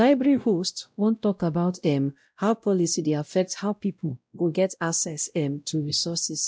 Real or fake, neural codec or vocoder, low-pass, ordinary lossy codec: fake; codec, 16 kHz, 0.5 kbps, X-Codec, WavLM features, trained on Multilingual LibriSpeech; none; none